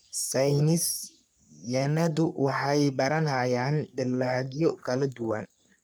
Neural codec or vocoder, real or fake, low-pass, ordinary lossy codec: codec, 44.1 kHz, 3.4 kbps, Pupu-Codec; fake; none; none